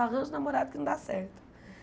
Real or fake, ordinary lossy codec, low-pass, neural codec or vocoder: real; none; none; none